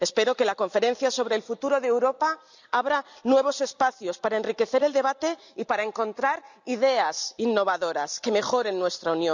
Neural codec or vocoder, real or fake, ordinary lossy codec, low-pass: none; real; none; 7.2 kHz